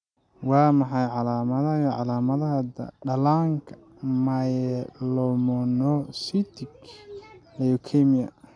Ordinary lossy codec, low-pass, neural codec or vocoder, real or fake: none; 9.9 kHz; none; real